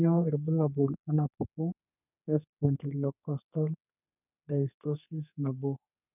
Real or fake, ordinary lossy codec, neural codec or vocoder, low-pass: fake; none; codec, 32 kHz, 1.9 kbps, SNAC; 3.6 kHz